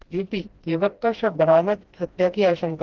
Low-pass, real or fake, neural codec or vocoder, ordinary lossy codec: 7.2 kHz; fake; codec, 16 kHz, 1 kbps, FreqCodec, smaller model; Opus, 16 kbps